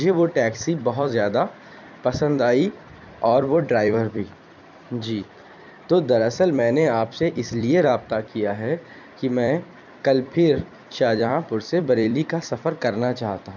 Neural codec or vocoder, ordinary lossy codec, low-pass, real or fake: vocoder, 44.1 kHz, 128 mel bands every 256 samples, BigVGAN v2; none; 7.2 kHz; fake